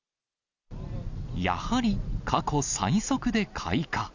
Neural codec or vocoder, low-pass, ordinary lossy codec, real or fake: none; 7.2 kHz; none; real